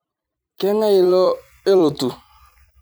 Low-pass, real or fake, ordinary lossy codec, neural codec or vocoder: none; fake; none; vocoder, 44.1 kHz, 128 mel bands every 256 samples, BigVGAN v2